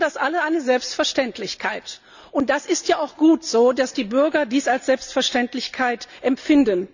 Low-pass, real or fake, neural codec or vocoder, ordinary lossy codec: 7.2 kHz; real; none; none